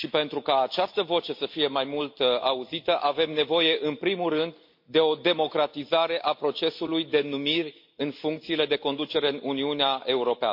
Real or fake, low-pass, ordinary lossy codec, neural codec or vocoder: real; 5.4 kHz; MP3, 32 kbps; none